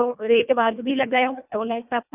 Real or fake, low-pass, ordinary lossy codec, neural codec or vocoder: fake; 3.6 kHz; none; codec, 24 kHz, 1.5 kbps, HILCodec